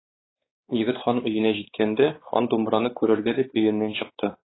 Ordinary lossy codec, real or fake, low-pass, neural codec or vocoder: AAC, 16 kbps; fake; 7.2 kHz; codec, 16 kHz, 4 kbps, X-Codec, WavLM features, trained on Multilingual LibriSpeech